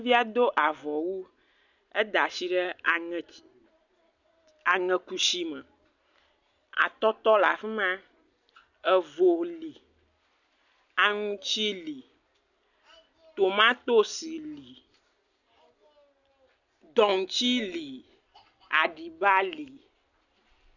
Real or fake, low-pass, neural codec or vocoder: real; 7.2 kHz; none